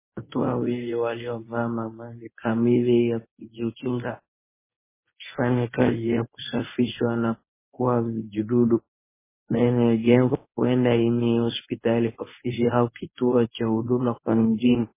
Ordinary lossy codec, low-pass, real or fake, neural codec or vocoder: MP3, 16 kbps; 3.6 kHz; fake; codec, 24 kHz, 0.9 kbps, WavTokenizer, medium speech release version 1